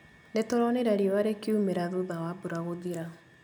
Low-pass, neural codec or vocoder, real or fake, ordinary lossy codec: none; none; real; none